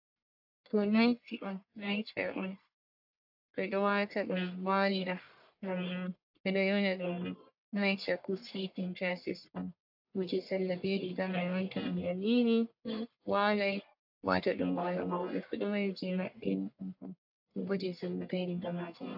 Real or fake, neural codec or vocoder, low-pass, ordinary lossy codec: fake; codec, 44.1 kHz, 1.7 kbps, Pupu-Codec; 5.4 kHz; AAC, 48 kbps